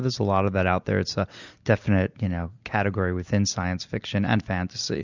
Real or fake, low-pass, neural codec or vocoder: real; 7.2 kHz; none